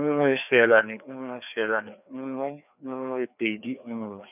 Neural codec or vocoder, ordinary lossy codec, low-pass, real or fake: codec, 16 kHz, 2 kbps, FreqCodec, larger model; none; 3.6 kHz; fake